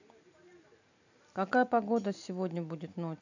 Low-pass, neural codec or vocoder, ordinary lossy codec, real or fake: 7.2 kHz; none; none; real